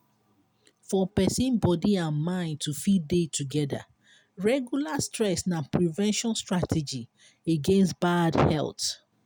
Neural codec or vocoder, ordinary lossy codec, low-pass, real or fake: none; none; none; real